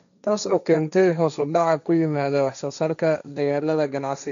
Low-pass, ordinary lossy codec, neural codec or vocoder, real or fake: 7.2 kHz; none; codec, 16 kHz, 1.1 kbps, Voila-Tokenizer; fake